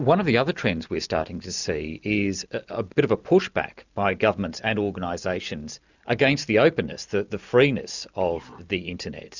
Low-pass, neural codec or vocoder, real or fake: 7.2 kHz; none; real